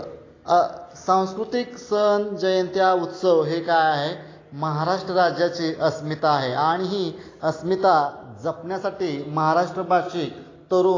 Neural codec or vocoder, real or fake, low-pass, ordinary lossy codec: none; real; 7.2 kHz; AAC, 32 kbps